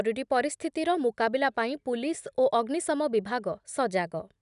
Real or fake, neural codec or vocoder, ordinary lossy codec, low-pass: real; none; none; 10.8 kHz